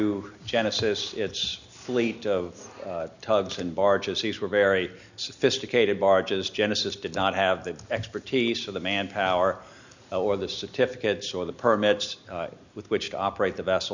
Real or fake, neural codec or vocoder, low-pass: real; none; 7.2 kHz